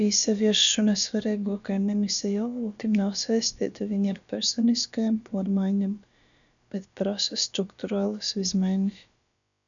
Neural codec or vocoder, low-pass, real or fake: codec, 16 kHz, about 1 kbps, DyCAST, with the encoder's durations; 7.2 kHz; fake